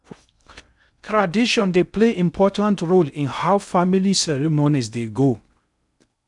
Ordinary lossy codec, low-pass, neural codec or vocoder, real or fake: none; 10.8 kHz; codec, 16 kHz in and 24 kHz out, 0.6 kbps, FocalCodec, streaming, 2048 codes; fake